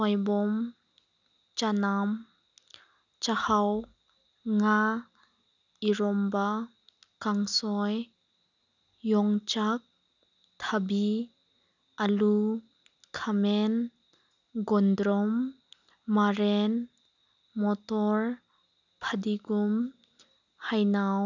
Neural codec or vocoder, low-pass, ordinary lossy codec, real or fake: none; 7.2 kHz; none; real